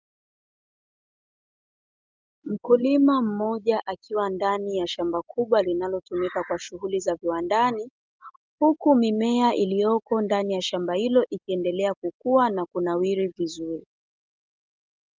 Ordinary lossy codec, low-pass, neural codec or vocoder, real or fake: Opus, 24 kbps; 7.2 kHz; none; real